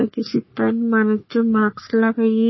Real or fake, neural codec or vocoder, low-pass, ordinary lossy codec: fake; codec, 44.1 kHz, 3.4 kbps, Pupu-Codec; 7.2 kHz; MP3, 24 kbps